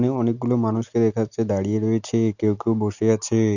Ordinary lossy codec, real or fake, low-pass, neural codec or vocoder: none; real; 7.2 kHz; none